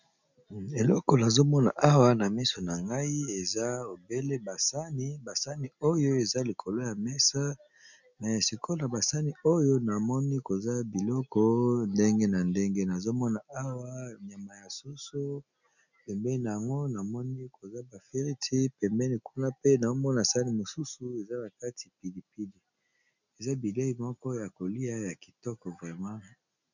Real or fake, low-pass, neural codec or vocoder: real; 7.2 kHz; none